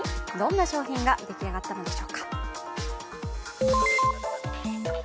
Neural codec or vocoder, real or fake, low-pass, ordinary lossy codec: none; real; none; none